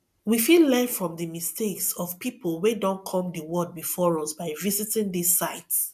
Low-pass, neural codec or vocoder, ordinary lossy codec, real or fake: 14.4 kHz; none; none; real